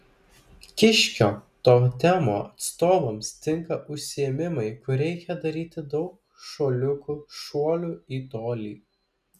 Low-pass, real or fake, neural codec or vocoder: 14.4 kHz; real; none